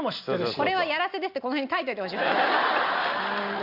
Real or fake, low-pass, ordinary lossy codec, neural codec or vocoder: real; 5.4 kHz; none; none